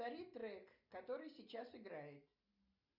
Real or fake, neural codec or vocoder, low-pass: real; none; 5.4 kHz